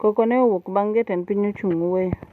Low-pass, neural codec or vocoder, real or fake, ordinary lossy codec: 14.4 kHz; none; real; none